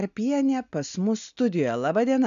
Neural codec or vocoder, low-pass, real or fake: none; 7.2 kHz; real